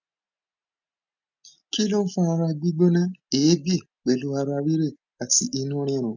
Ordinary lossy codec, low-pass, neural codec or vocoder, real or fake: none; 7.2 kHz; none; real